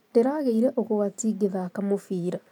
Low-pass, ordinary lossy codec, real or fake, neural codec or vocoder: 19.8 kHz; none; fake; vocoder, 44.1 kHz, 128 mel bands every 512 samples, BigVGAN v2